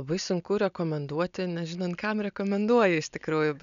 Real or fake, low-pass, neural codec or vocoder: real; 7.2 kHz; none